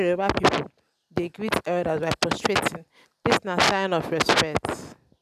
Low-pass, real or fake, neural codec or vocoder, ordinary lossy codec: 14.4 kHz; real; none; none